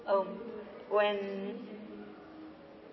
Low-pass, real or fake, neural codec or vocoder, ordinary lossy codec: 7.2 kHz; real; none; MP3, 24 kbps